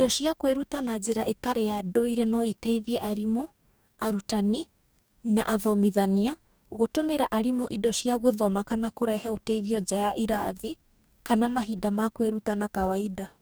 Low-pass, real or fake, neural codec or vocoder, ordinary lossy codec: none; fake; codec, 44.1 kHz, 2.6 kbps, DAC; none